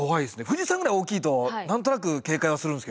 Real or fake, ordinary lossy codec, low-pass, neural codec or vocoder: real; none; none; none